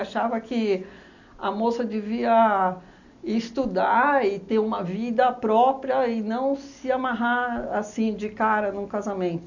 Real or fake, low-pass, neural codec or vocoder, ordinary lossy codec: real; 7.2 kHz; none; none